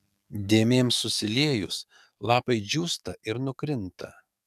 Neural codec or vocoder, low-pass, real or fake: codec, 44.1 kHz, 7.8 kbps, DAC; 14.4 kHz; fake